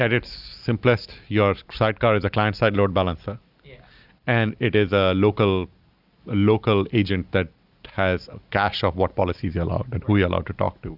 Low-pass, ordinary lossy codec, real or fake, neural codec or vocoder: 5.4 kHz; Opus, 64 kbps; real; none